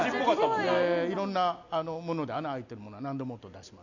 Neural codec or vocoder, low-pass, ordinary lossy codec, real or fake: none; 7.2 kHz; none; real